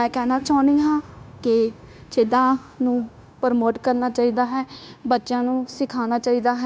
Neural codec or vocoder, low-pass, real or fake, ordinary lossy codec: codec, 16 kHz, 0.9 kbps, LongCat-Audio-Codec; none; fake; none